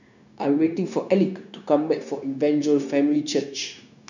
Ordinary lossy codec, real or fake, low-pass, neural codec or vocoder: none; fake; 7.2 kHz; codec, 16 kHz, 0.9 kbps, LongCat-Audio-Codec